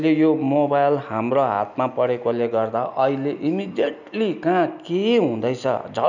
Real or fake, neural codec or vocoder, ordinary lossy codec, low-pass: real; none; none; 7.2 kHz